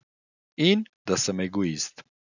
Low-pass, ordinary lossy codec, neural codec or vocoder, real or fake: 7.2 kHz; none; none; real